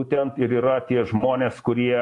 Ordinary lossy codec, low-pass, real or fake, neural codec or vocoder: AAC, 48 kbps; 10.8 kHz; real; none